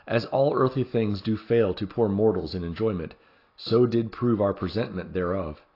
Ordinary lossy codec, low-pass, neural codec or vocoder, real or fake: AAC, 32 kbps; 5.4 kHz; none; real